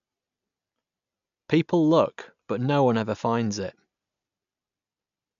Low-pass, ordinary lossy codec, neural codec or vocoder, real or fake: 7.2 kHz; none; none; real